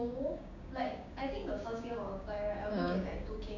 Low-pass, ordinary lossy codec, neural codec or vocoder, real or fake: 7.2 kHz; none; codec, 16 kHz, 6 kbps, DAC; fake